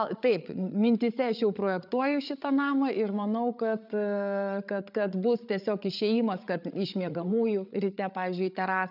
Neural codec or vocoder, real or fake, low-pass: codec, 16 kHz, 8 kbps, FreqCodec, larger model; fake; 5.4 kHz